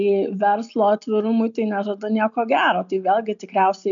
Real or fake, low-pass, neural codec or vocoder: real; 7.2 kHz; none